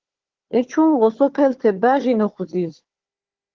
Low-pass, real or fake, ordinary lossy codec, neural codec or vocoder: 7.2 kHz; fake; Opus, 16 kbps; codec, 16 kHz, 4 kbps, FunCodec, trained on Chinese and English, 50 frames a second